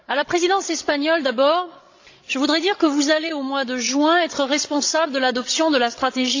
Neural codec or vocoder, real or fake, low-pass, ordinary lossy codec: codec, 16 kHz, 16 kbps, FreqCodec, larger model; fake; 7.2 kHz; AAC, 48 kbps